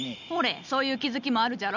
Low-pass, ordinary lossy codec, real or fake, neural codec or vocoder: 7.2 kHz; none; real; none